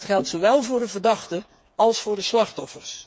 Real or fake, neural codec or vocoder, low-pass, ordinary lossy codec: fake; codec, 16 kHz, 4 kbps, FreqCodec, smaller model; none; none